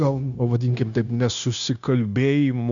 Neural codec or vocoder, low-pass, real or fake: codec, 16 kHz, 0.9 kbps, LongCat-Audio-Codec; 7.2 kHz; fake